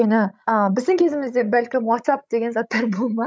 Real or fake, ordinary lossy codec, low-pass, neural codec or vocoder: fake; none; none; codec, 16 kHz, 16 kbps, FreqCodec, larger model